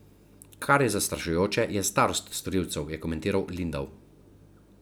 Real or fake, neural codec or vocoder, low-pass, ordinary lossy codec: real; none; none; none